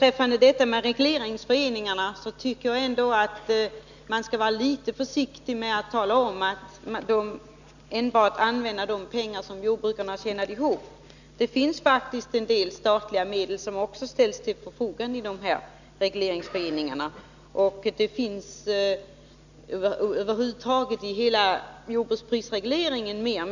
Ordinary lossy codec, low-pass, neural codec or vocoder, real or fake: none; 7.2 kHz; none; real